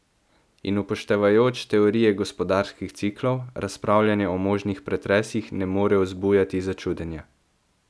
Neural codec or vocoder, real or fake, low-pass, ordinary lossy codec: none; real; none; none